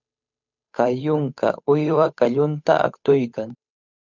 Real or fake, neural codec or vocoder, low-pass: fake; codec, 16 kHz, 8 kbps, FunCodec, trained on Chinese and English, 25 frames a second; 7.2 kHz